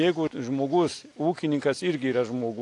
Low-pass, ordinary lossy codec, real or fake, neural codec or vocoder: 10.8 kHz; AAC, 48 kbps; real; none